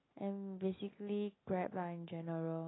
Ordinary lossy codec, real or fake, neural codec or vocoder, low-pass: AAC, 16 kbps; real; none; 7.2 kHz